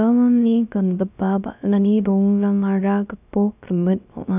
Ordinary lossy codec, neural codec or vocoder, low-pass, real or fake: none; codec, 16 kHz, 0.3 kbps, FocalCodec; 3.6 kHz; fake